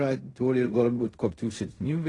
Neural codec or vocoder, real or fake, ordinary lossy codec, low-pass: codec, 16 kHz in and 24 kHz out, 0.4 kbps, LongCat-Audio-Codec, fine tuned four codebook decoder; fake; AAC, 32 kbps; 10.8 kHz